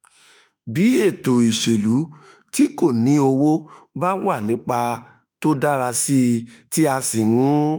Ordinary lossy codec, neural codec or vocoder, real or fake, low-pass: none; autoencoder, 48 kHz, 32 numbers a frame, DAC-VAE, trained on Japanese speech; fake; none